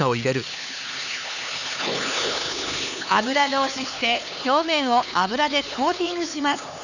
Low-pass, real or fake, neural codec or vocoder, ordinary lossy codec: 7.2 kHz; fake; codec, 16 kHz, 4 kbps, X-Codec, HuBERT features, trained on LibriSpeech; none